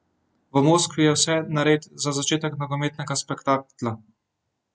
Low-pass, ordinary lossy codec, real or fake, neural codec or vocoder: none; none; real; none